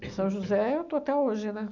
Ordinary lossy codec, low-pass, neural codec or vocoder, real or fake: none; 7.2 kHz; none; real